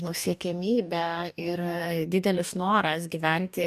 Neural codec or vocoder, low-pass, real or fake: codec, 44.1 kHz, 2.6 kbps, DAC; 14.4 kHz; fake